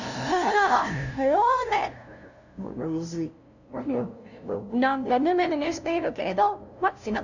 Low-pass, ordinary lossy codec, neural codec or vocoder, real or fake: 7.2 kHz; none; codec, 16 kHz, 0.5 kbps, FunCodec, trained on LibriTTS, 25 frames a second; fake